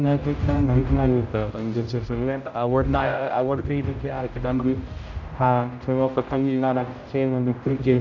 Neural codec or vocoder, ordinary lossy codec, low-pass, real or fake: codec, 16 kHz, 0.5 kbps, X-Codec, HuBERT features, trained on general audio; none; 7.2 kHz; fake